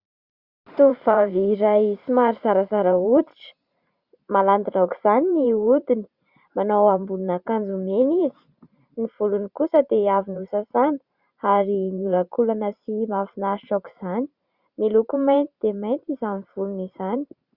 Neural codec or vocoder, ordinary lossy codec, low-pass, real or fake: vocoder, 44.1 kHz, 128 mel bands every 512 samples, BigVGAN v2; Opus, 64 kbps; 5.4 kHz; fake